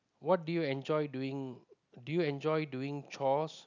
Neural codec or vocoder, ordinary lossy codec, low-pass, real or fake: none; none; 7.2 kHz; real